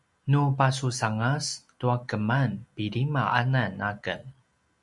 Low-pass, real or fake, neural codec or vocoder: 10.8 kHz; real; none